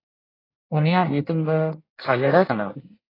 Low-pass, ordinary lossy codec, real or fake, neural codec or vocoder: 5.4 kHz; AAC, 24 kbps; fake; codec, 24 kHz, 1 kbps, SNAC